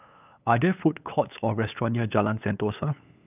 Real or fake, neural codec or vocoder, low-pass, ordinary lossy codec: fake; codec, 16 kHz, 8 kbps, FunCodec, trained on LibriTTS, 25 frames a second; 3.6 kHz; none